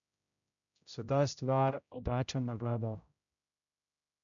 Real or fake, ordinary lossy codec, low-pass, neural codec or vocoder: fake; none; 7.2 kHz; codec, 16 kHz, 0.5 kbps, X-Codec, HuBERT features, trained on general audio